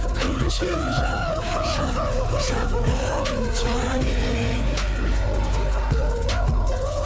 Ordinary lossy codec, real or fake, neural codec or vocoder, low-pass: none; fake; codec, 16 kHz, 4 kbps, FreqCodec, larger model; none